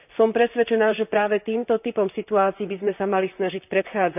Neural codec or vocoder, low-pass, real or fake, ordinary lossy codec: vocoder, 44.1 kHz, 128 mel bands, Pupu-Vocoder; 3.6 kHz; fake; none